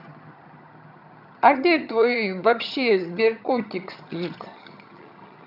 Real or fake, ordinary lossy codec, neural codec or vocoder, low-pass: fake; none; vocoder, 22.05 kHz, 80 mel bands, HiFi-GAN; 5.4 kHz